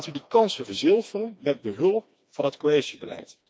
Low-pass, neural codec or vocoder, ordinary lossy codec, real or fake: none; codec, 16 kHz, 2 kbps, FreqCodec, smaller model; none; fake